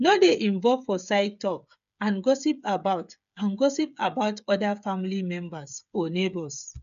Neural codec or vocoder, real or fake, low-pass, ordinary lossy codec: codec, 16 kHz, 8 kbps, FreqCodec, smaller model; fake; 7.2 kHz; none